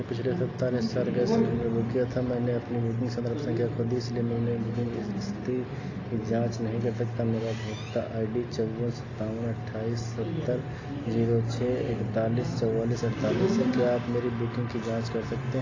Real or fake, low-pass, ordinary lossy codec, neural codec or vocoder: real; 7.2 kHz; AAC, 32 kbps; none